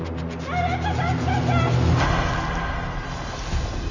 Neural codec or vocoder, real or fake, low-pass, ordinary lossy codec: none; real; 7.2 kHz; none